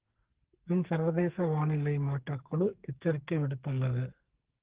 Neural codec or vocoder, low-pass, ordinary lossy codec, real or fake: codec, 44.1 kHz, 2.6 kbps, SNAC; 3.6 kHz; Opus, 16 kbps; fake